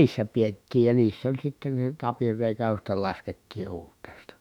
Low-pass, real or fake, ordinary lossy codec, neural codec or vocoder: 19.8 kHz; fake; none; autoencoder, 48 kHz, 32 numbers a frame, DAC-VAE, trained on Japanese speech